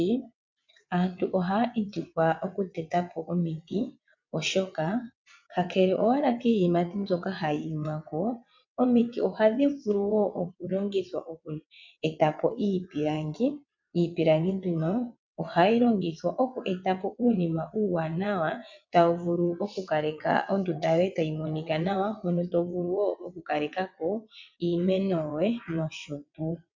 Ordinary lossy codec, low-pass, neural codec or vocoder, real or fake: MP3, 64 kbps; 7.2 kHz; vocoder, 22.05 kHz, 80 mel bands, WaveNeXt; fake